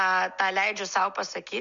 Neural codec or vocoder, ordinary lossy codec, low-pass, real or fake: none; Opus, 64 kbps; 7.2 kHz; real